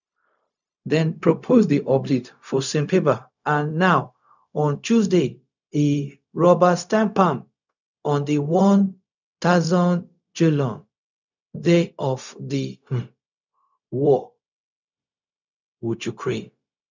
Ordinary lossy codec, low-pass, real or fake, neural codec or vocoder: none; 7.2 kHz; fake; codec, 16 kHz, 0.4 kbps, LongCat-Audio-Codec